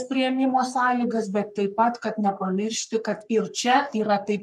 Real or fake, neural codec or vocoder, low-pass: fake; codec, 44.1 kHz, 3.4 kbps, Pupu-Codec; 14.4 kHz